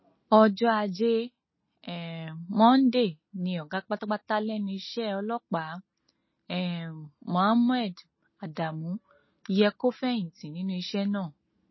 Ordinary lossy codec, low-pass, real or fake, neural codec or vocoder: MP3, 24 kbps; 7.2 kHz; real; none